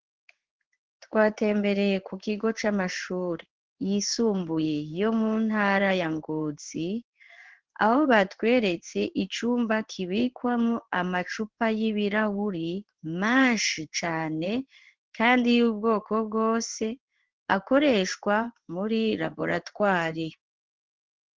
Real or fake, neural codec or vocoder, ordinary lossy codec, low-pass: fake; codec, 16 kHz in and 24 kHz out, 1 kbps, XY-Tokenizer; Opus, 16 kbps; 7.2 kHz